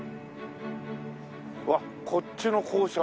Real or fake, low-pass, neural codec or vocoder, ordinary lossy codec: real; none; none; none